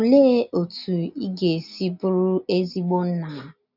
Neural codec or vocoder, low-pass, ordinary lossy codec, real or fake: none; 5.4 kHz; none; real